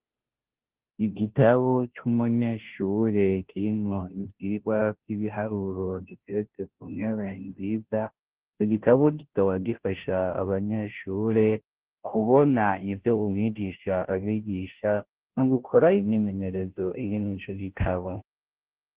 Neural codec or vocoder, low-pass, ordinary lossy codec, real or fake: codec, 16 kHz, 0.5 kbps, FunCodec, trained on Chinese and English, 25 frames a second; 3.6 kHz; Opus, 16 kbps; fake